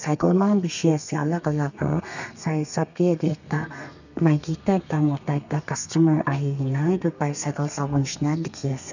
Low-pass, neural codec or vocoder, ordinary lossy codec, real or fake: 7.2 kHz; codec, 32 kHz, 1.9 kbps, SNAC; none; fake